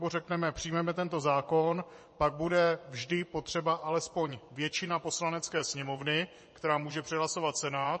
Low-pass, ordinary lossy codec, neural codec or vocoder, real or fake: 10.8 kHz; MP3, 32 kbps; vocoder, 24 kHz, 100 mel bands, Vocos; fake